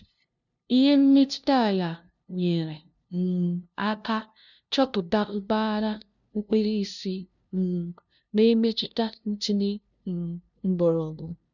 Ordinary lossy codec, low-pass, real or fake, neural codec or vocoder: none; 7.2 kHz; fake; codec, 16 kHz, 0.5 kbps, FunCodec, trained on LibriTTS, 25 frames a second